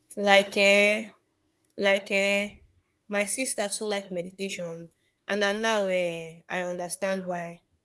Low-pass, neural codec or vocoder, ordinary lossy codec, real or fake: none; codec, 24 kHz, 1 kbps, SNAC; none; fake